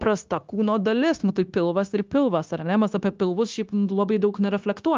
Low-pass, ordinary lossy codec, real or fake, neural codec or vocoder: 7.2 kHz; Opus, 32 kbps; fake; codec, 16 kHz, 0.9 kbps, LongCat-Audio-Codec